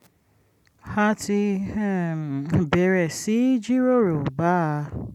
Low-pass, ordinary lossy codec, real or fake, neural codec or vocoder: 19.8 kHz; none; real; none